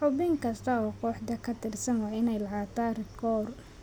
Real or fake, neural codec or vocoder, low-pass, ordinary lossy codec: real; none; none; none